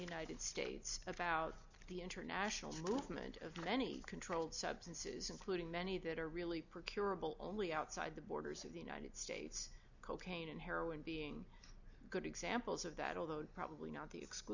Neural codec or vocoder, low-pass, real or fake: none; 7.2 kHz; real